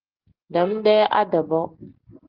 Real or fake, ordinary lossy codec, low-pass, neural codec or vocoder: real; Opus, 16 kbps; 5.4 kHz; none